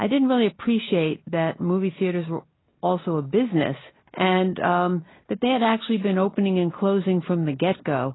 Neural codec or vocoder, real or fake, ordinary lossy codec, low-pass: none; real; AAC, 16 kbps; 7.2 kHz